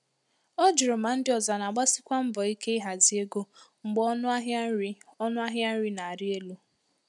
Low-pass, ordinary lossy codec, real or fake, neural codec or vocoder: 10.8 kHz; none; real; none